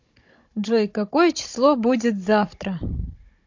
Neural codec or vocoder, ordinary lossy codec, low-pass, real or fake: codec, 16 kHz, 16 kbps, FunCodec, trained on Chinese and English, 50 frames a second; MP3, 48 kbps; 7.2 kHz; fake